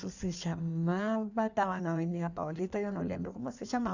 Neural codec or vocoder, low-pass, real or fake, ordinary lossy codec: codec, 16 kHz in and 24 kHz out, 1.1 kbps, FireRedTTS-2 codec; 7.2 kHz; fake; none